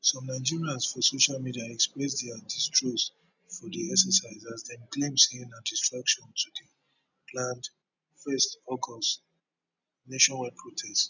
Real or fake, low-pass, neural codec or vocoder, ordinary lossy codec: real; 7.2 kHz; none; none